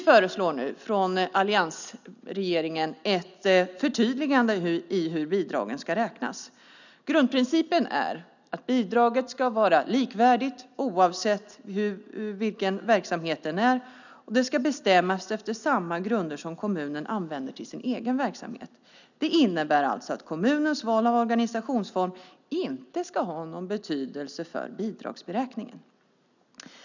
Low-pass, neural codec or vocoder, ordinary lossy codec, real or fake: 7.2 kHz; none; none; real